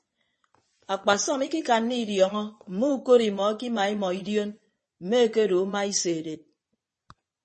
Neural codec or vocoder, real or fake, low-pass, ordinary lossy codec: vocoder, 22.05 kHz, 80 mel bands, Vocos; fake; 9.9 kHz; MP3, 32 kbps